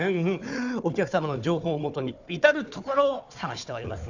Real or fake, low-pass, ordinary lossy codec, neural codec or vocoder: fake; 7.2 kHz; none; codec, 16 kHz, 4 kbps, FunCodec, trained on Chinese and English, 50 frames a second